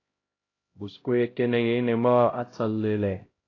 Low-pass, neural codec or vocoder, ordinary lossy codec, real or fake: 7.2 kHz; codec, 16 kHz, 0.5 kbps, X-Codec, HuBERT features, trained on LibriSpeech; AAC, 32 kbps; fake